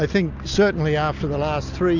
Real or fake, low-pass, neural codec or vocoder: real; 7.2 kHz; none